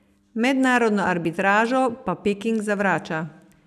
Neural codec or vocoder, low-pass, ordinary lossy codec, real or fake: none; 14.4 kHz; none; real